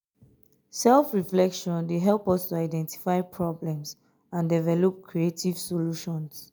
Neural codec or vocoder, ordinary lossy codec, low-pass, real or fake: none; none; none; real